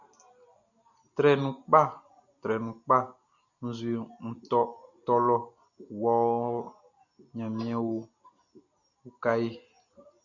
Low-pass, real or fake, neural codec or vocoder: 7.2 kHz; real; none